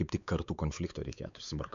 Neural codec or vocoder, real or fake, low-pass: codec, 16 kHz, 4 kbps, X-Codec, WavLM features, trained on Multilingual LibriSpeech; fake; 7.2 kHz